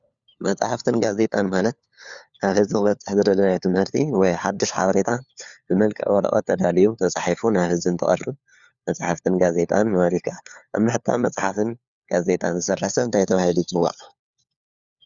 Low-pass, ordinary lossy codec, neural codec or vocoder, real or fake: 7.2 kHz; Opus, 64 kbps; codec, 16 kHz, 4 kbps, FunCodec, trained on LibriTTS, 50 frames a second; fake